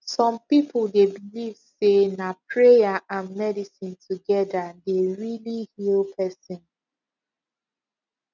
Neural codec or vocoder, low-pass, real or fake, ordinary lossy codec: none; 7.2 kHz; real; none